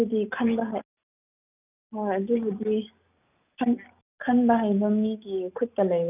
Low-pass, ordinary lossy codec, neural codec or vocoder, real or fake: 3.6 kHz; none; none; real